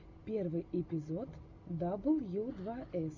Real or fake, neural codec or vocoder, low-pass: real; none; 7.2 kHz